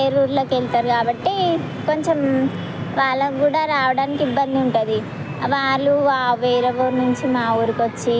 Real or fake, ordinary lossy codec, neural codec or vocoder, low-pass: real; none; none; none